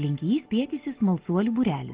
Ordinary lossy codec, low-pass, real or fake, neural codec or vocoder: Opus, 16 kbps; 3.6 kHz; real; none